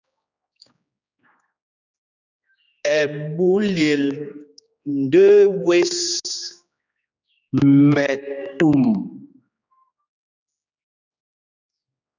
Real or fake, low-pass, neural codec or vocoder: fake; 7.2 kHz; codec, 16 kHz, 2 kbps, X-Codec, HuBERT features, trained on general audio